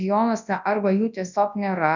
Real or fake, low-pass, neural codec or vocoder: fake; 7.2 kHz; codec, 24 kHz, 0.9 kbps, WavTokenizer, large speech release